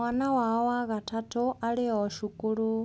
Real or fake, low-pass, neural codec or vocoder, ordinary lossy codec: real; none; none; none